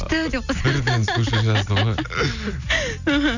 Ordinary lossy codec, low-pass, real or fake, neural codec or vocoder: none; 7.2 kHz; real; none